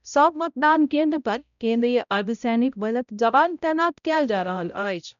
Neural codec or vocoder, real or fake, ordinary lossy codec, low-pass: codec, 16 kHz, 0.5 kbps, X-Codec, HuBERT features, trained on balanced general audio; fake; none; 7.2 kHz